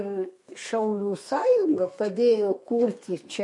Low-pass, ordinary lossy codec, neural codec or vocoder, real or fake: 10.8 kHz; MP3, 48 kbps; codec, 32 kHz, 1.9 kbps, SNAC; fake